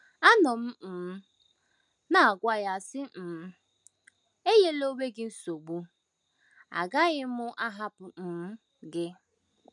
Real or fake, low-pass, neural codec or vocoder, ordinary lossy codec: real; 10.8 kHz; none; none